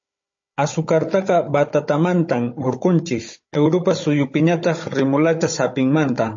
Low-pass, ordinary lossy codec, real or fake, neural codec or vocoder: 7.2 kHz; MP3, 32 kbps; fake; codec, 16 kHz, 16 kbps, FunCodec, trained on Chinese and English, 50 frames a second